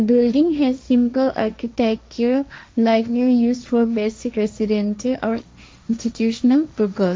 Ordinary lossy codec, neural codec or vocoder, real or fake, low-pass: none; codec, 16 kHz, 1.1 kbps, Voila-Tokenizer; fake; none